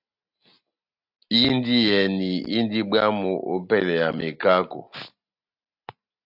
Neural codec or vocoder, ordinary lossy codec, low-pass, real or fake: none; AAC, 48 kbps; 5.4 kHz; real